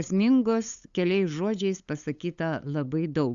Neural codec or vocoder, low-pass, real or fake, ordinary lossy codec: codec, 16 kHz, 8 kbps, FunCodec, trained on LibriTTS, 25 frames a second; 7.2 kHz; fake; Opus, 64 kbps